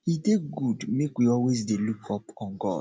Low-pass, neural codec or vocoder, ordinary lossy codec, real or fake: none; none; none; real